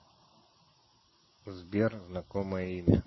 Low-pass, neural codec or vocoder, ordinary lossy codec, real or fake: 7.2 kHz; none; MP3, 24 kbps; real